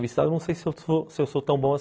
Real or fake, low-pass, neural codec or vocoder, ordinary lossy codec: real; none; none; none